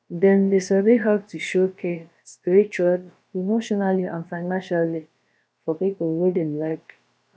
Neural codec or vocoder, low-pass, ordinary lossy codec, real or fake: codec, 16 kHz, about 1 kbps, DyCAST, with the encoder's durations; none; none; fake